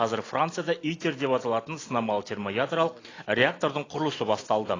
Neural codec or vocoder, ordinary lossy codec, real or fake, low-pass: none; AAC, 32 kbps; real; 7.2 kHz